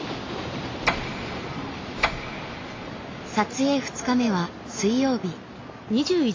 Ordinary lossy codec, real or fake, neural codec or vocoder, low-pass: AAC, 32 kbps; real; none; 7.2 kHz